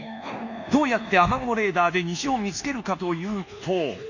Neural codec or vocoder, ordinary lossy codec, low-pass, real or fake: codec, 24 kHz, 1.2 kbps, DualCodec; none; 7.2 kHz; fake